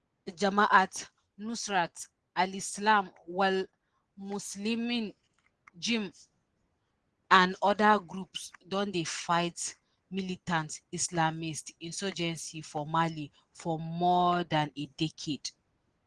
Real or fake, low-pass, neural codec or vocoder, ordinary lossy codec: real; 9.9 kHz; none; Opus, 16 kbps